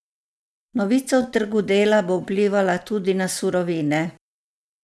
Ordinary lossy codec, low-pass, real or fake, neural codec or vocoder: none; none; real; none